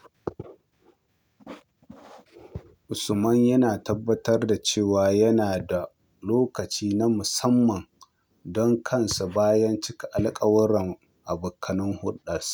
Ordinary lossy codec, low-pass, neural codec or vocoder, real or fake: none; none; none; real